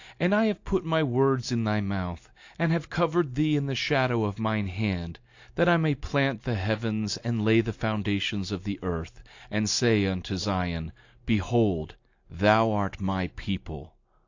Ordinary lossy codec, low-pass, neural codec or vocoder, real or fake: AAC, 48 kbps; 7.2 kHz; none; real